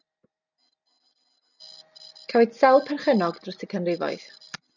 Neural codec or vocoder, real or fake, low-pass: none; real; 7.2 kHz